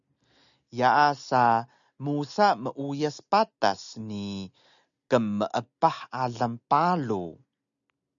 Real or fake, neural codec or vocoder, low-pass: real; none; 7.2 kHz